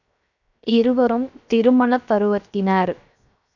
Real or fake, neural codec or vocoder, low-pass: fake; codec, 16 kHz, 0.7 kbps, FocalCodec; 7.2 kHz